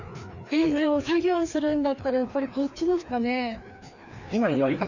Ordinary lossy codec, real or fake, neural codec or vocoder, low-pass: none; fake; codec, 16 kHz, 2 kbps, FreqCodec, larger model; 7.2 kHz